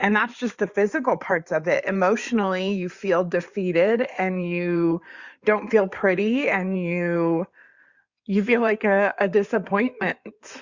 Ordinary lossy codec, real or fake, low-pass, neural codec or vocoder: Opus, 64 kbps; fake; 7.2 kHz; codec, 16 kHz in and 24 kHz out, 2.2 kbps, FireRedTTS-2 codec